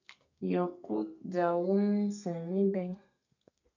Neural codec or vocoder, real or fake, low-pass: codec, 32 kHz, 1.9 kbps, SNAC; fake; 7.2 kHz